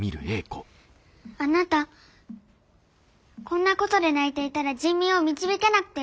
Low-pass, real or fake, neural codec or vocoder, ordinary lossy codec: none; real; none; none